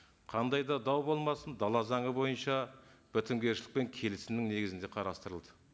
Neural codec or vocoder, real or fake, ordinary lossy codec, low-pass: none; real; none; none